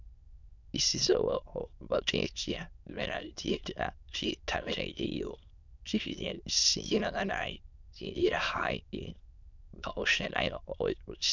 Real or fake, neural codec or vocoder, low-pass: fake; autoencoder, 22.05 kHz, a latent of 192 numbers a frame, VITS, trained on many speakers; 7.2 kHz